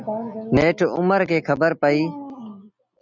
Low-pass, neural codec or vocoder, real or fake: 7.2 kHz; none; real